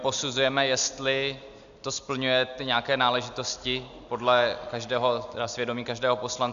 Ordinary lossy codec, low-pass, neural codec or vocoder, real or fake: AAC, 96 kbps; 7.2 kHz; none; real